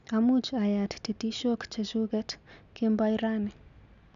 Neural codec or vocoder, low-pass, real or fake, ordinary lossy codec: none; 7.2 kHz; real; none